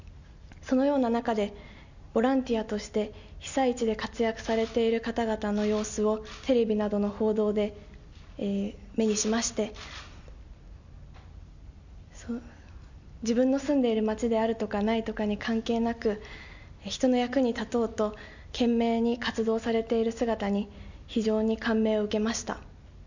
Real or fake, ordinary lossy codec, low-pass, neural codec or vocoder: real; none; 7.2 kHz; none